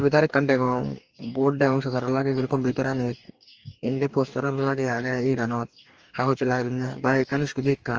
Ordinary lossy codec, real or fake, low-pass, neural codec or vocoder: Opus, 24 kbps; fake; 7.2 kHz; codec, 44.1 kHz, 2.6 kbps, DAC